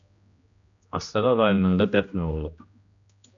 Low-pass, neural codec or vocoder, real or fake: 7.2 kHz; codec, 16 kHz, 1 kbps, X-Codec, HuBERT features, trained on general audio; fake